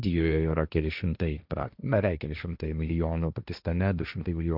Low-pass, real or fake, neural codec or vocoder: 5.4 kHz; fake; codec, 16 kHz, 1.1 kbps, Voila-Tokenizer